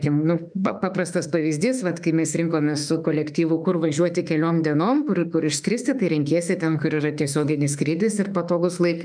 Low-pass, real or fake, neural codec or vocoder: 9.9 kHz; fake; autoencoder, 48 kHz, 32 numbers a frame, DAC-VAE, trained on Japanese speech